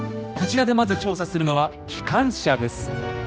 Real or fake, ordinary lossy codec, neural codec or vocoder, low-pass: fake; none; codec, 16 kHz, 1 kbps, X-Codec, HuBERT features, trained on general audio; none